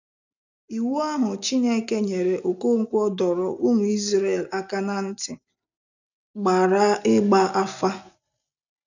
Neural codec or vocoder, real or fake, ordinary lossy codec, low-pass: none; real; none; 7.2 kHz